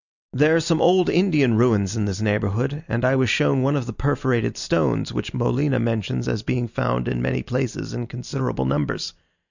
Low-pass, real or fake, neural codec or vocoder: 7.2 kHz; real; none